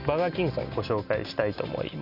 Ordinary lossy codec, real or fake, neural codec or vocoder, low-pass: none; real; none; 5.4 kHz